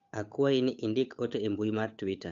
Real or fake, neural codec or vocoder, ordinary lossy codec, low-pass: fake; codec, 16 kHz, 8 kbps, FunCodec, trained on Chinese and English, 25 frames a second; AAC, 64 kbps; 7.2 kHz